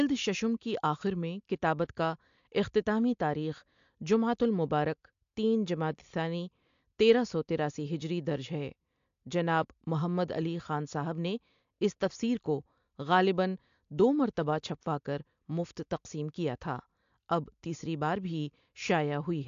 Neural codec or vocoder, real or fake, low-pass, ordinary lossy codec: none; real; 7.2 kHz; AAC, 64 kbps